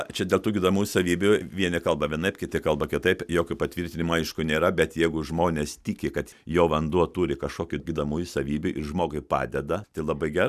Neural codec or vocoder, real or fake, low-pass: none; real; 14.4 kHz